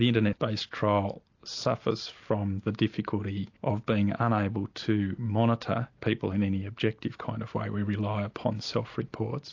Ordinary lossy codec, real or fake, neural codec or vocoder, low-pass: AAC, 48 kbps; real; none; 7.2 kHz